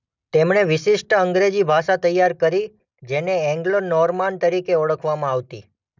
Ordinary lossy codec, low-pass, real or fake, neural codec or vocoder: none; 7.2 kHz; real; none